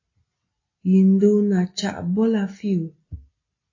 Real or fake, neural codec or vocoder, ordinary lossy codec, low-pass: real; none; AAC, 32 kbps; 7.2 kHz